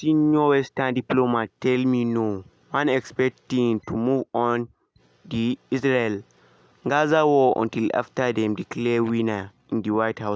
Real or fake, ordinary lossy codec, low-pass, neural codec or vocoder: real; none; none; none